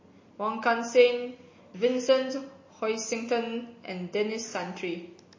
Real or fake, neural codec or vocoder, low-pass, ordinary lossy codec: real; none; 7.2 kHz; MP3, 32 kbps